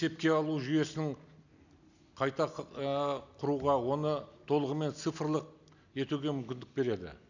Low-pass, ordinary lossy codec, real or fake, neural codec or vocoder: 7.2 kHz; none; real; none